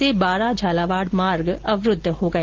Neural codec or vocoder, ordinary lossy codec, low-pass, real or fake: none; Opus, 16 kbps; 7.2 kHz; real